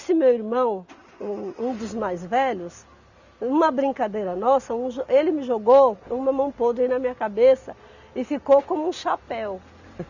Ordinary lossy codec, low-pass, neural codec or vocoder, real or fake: none; 7.2 kHz; none; real